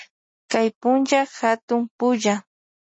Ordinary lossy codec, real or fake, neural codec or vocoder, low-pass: MP3, 32 kbps; real; none; 9.9 kHz